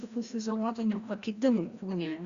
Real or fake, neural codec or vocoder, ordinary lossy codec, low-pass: fake; codec, 16 kHz, 1 kbps, FreqCodec, larger model; Opus, 64 kbps; 7.2 kHz